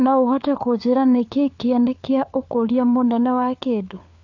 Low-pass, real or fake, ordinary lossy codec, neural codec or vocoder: 7.2 kHz; fake; AAC, 48 kbps; codec, 16 kHz, 4 kbps, FunCodec, trained on LibriTTS, 50 frames a second